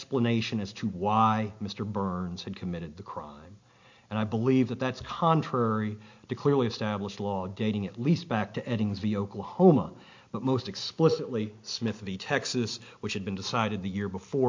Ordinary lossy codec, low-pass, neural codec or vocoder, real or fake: MP3, 48 kbps; 7.2 kHz; none; real